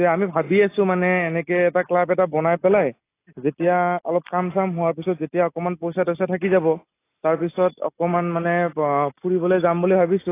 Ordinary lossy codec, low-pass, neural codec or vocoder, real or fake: AAC, 24 kbps; 3.6 kHz; none; real